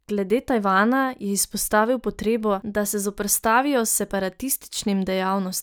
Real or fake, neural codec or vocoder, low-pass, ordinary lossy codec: real; none; none; none